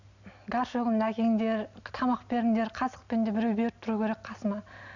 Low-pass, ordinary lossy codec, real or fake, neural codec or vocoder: 7.2 kHz; none; real; none